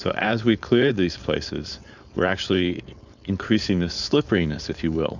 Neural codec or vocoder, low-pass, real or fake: codec, 16 kHz, 4.8 kbps, FACodec; 7.2 kHz; fake